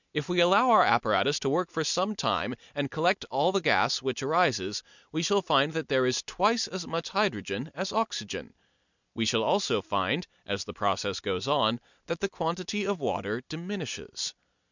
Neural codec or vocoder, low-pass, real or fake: none; 7.2 kHz; real